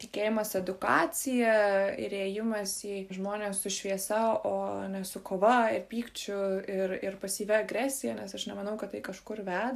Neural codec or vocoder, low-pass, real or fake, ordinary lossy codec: none; 14.4 kHz; real; AAC, 96 kbps